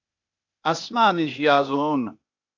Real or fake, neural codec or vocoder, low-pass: fake; codec, 16 kHz, 0.8 kbps, ZipCodec; 7.2 kHz